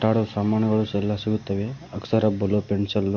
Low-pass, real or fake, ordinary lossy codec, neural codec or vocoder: 7.2 kHz; real; Opus, 64 kbps; none